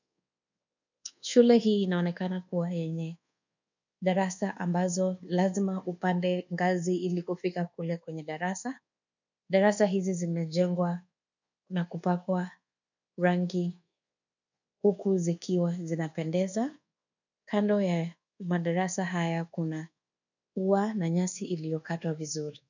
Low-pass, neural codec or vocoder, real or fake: 7.2 kHz; codec, 24 kHz, 1.2 kbps, DualCodec; fake